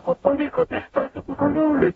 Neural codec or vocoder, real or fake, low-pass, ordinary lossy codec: codec, 44.1 kHz, 0.9 kbps, DAC; fake; 19.8 kHz; AAC, 24 kbps